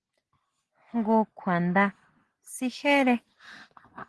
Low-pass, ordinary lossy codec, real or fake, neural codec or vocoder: 10.8 kHz; Opus, 16 kbps; real; none